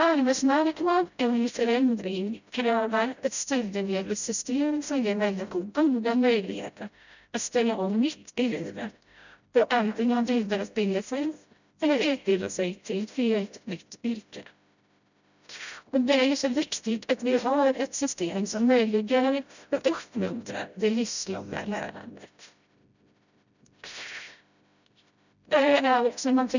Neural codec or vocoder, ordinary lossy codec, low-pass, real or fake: codec, 16 kHz, 0.5 kbps, FreqCodec, smaller model; none; 7.2 kHz; fake